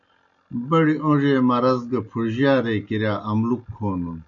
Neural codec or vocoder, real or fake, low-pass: none; real; 7.2 kHz